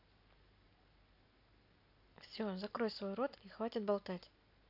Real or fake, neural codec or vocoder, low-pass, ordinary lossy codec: real; none; 5.4 kHz; AAC, 48 kbps